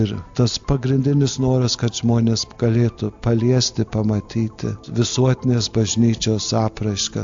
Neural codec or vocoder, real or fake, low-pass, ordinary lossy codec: none; real; 7.2 kHz; AAC, 64 kbps